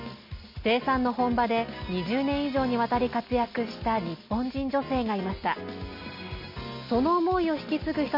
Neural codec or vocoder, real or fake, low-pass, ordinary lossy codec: none; real; 5.4 kHz; MP3, 48 kbps